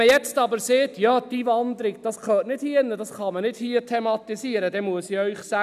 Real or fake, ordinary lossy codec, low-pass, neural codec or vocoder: real; none; 14.4 kHz; none